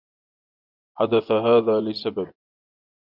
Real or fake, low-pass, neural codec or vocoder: fake; 5.4 kHz; vocoder, 24 kHz, 100 mel bands, Vocos